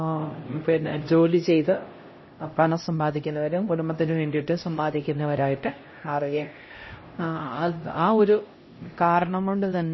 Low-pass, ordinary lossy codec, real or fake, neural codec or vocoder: 7.2 kHz; MP3, 24 kbps; fake; codec, 16 kHz, 0.5 kbps, X-Codec, HuBERT features, trained on LibriSpeech